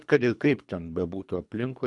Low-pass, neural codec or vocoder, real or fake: 10.8 kHz; codec, 24 kHz, 3 kbps, HILCodec; fake